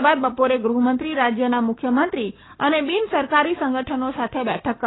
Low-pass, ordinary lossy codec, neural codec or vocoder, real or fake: 7.2 kHz; AAC, 16 kbps; codec, 16 kHz, 6 kbps, DAC; fake